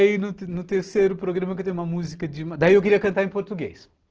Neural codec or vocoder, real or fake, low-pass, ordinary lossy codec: none; real; 7.2 kHz; Opus, 16 kbps